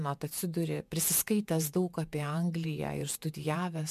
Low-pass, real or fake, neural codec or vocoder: 14.4 kHz; fake; vocoder, 48 kHz, 128 mel bands, Vocos